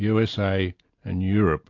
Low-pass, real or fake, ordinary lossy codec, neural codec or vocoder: 7.2 kHz; real; MP3, 48 kbps; none